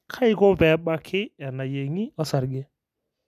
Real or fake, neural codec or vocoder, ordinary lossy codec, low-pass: real; none; none; 14.4 kHz